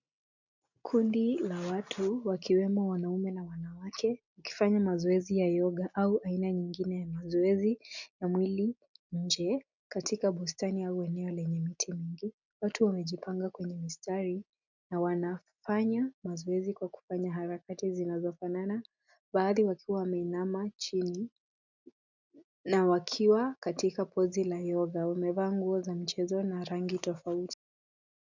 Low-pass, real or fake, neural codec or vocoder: 7.2 kHz; real; none